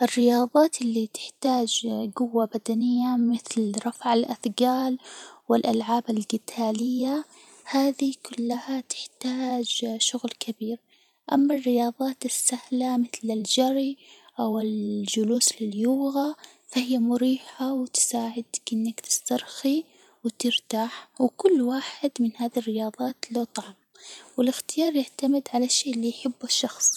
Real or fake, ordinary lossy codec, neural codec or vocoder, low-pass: fake; none; vocoder, 44.1 kHz, 128 mel bands every 512 samples, BigVGAN v2; 19.8 kHz